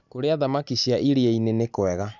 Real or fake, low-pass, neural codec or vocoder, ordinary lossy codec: real; 7.2 kHz; none; none